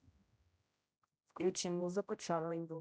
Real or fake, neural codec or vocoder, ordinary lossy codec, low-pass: fake; codec, 16 kHz, 0.5 kbps, X-Codec, HuBERT features, trained on general audio; none; none